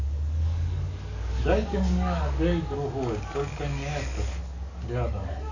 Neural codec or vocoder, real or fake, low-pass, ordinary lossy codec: codec, 44.1 kHz, 7.8 kbps, DAC; fake; 7.2 kHz; none